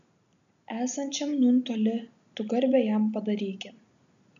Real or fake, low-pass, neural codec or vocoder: real; 7.2 kHz; none